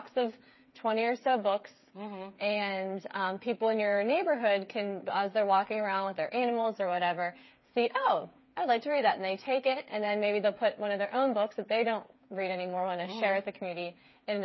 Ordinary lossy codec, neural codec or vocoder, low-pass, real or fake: MP3, 24 kbps; codec, 16 kHz, 8 kbps, FreqCodec, smaller model; 7.2 kHz; fake